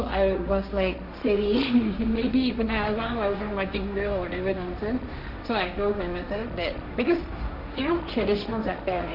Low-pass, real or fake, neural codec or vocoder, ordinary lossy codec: 5.4 kHz; fake; codec, 16 kHz, 1.1 kbps, Voila-Tokenizer; none